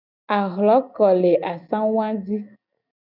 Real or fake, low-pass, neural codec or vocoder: real; 5.4 kHz; none